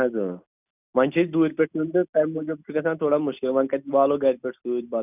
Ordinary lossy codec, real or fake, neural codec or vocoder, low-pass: none; real; none; 3.6 kHz